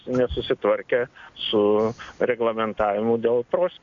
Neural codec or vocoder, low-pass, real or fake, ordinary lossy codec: none; 7.2 kHz; real; MP3, 64 kbps